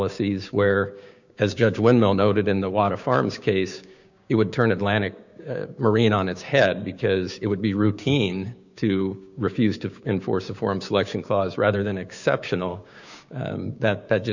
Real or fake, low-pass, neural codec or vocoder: fake; 7.2 kHz; codec, 16 kHz, 6 kbps, DAC